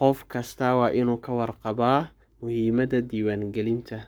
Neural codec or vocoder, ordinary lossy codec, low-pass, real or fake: codec, 44.1 kHz, 7.8 kbps, Pupu-Codec; none; none; fake